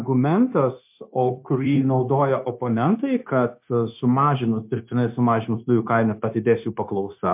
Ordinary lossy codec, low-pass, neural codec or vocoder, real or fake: MP3, 32 kbps; 3.6 kHz; codec, 16 kHz, 0.9 kbps, LongCat-Audio-Codec; fake